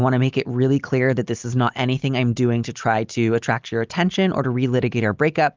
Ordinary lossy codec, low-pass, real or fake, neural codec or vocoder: Opus, 32 kbps; 7.2 kHz; real; none